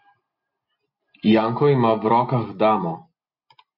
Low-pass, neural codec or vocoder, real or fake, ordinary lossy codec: 5.4 kHz; none; real; MP3, 32 kbps